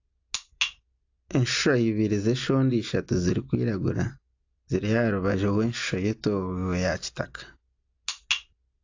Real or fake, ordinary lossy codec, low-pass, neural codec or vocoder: real; AAC, 48 kbps; 7.2 kHz; none